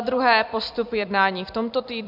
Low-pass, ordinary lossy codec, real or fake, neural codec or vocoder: 5.4 kHz; MP3, 48 kbps; fake; vocoder, 44.1 kHz, 128 mel bands every 256 samples, BigVGAN v2